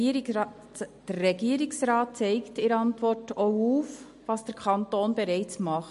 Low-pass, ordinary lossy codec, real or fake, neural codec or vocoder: 14.4 kHz; MP3, 48 kbps; real; none